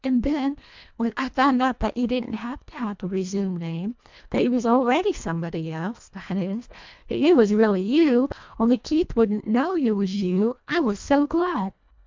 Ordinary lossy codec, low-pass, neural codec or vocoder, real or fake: MP3, 64 kbps; 7.2 kHz; codec, 24 kHz, 1.5 kbps, HILCodec; fake